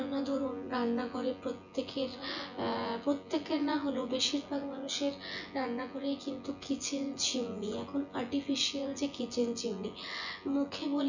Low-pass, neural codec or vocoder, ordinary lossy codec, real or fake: 7.2 kHz; vocoder, 24 kHz, 100 mel bands, Vocos; none; fake